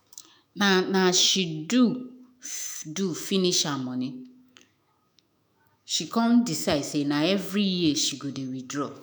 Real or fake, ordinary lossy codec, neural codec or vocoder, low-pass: fake; none; autoencoder, 48 kHz, 128 numbers a frame, DAC-VAE, trained on Japanese speech; none